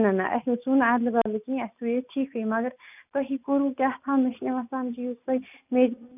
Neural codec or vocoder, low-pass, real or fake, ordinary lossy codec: none; 3.6 kHz; real; none